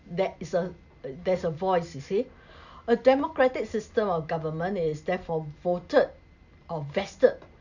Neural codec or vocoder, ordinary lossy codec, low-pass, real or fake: none; none; 7.2 kHz; real